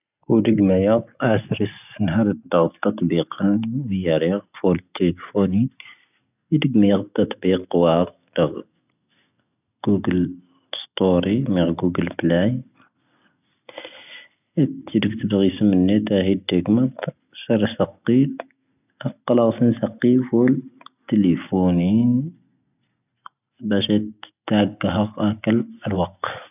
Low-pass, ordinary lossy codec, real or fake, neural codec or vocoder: 3.6 kHz; none; real; none